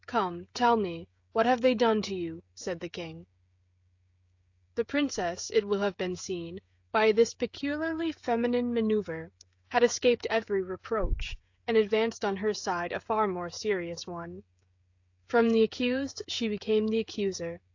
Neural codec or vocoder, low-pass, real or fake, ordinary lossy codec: codec, 16 kHz, 16 kbps, FreqCodec, smaller model; 7.2 kHz; fake; AAC, 48 kbps